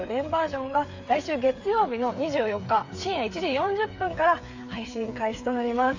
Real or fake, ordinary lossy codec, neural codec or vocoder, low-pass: fake; AAC, 48 kbps; codec, 16 kHz, 8 kbps, FreqCodec, smaller model; 7.2 kHz